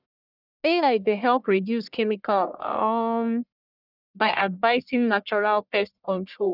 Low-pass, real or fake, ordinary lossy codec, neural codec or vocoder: 5.4 kHz; fake; none; codec, 44.1 kHz, 1.7 kbps, Pupu-Codec